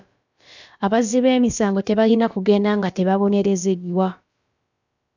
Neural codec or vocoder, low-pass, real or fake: codec, 16 kHz, about 1 kbps, DyCAST, with the encoder's durations; 7.2 kHz; fake